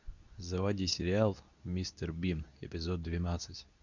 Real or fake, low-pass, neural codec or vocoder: fake; 7.2 kHz; codec, 24 kHz, 0.9 kbps, WavTokenizer, medium speech release version 2